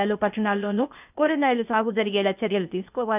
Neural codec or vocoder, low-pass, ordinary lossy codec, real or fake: codec, 16 kHz, 0.8 kbps, ZipCodec; 3.6 kHz; none; fake